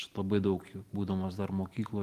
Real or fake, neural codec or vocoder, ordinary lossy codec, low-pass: real; none; Opus, 24 kbps; 14.4 kHz